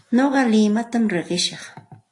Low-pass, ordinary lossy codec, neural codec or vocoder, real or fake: 10.8 kHz; AAC, 48 kbps; none; real